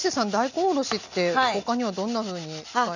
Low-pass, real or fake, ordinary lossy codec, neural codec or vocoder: 7.2 kHz; real; none; none